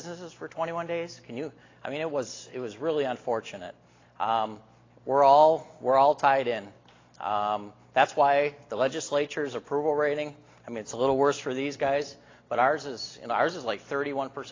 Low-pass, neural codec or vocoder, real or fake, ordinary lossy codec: 7.2 kHz; none; real; AAC, 32 kbps